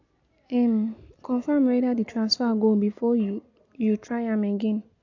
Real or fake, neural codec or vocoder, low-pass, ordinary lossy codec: real; none; 7.2 kHz; AAC, 48 kbps